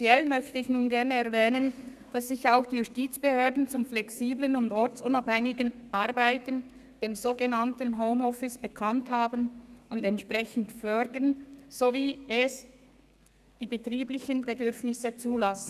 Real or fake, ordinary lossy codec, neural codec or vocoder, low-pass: fake; none; codec, 32 kHz, 1.9 kbps, SNAC; 14.4 kHz